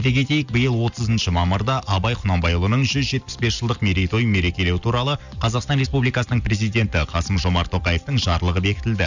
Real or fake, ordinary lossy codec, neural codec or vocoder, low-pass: real; none; none; 7.2 kHz